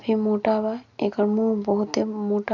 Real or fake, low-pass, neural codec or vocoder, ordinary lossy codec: real; 7.2 kHz; none; none